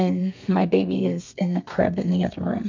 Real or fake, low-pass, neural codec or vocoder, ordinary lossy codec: fake; 7.2 kHz; codec, 32 kHz, 1.9 kbps, SNAC; MP3, 64 kbps